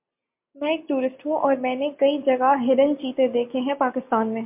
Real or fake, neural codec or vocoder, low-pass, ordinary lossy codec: real; none; 3.6 kHz; MP3, 32 kbps